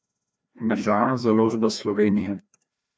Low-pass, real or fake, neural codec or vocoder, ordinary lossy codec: none; fake; codec, 16 kHz, 1 kbps, FreqCodec, larger model; none